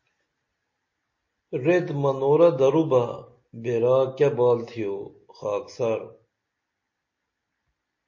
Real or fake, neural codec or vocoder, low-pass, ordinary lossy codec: real; none; 7.2 kHz; MP3, 32 kbps